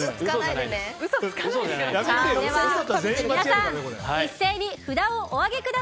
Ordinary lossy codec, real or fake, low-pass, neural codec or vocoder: none; real; none; none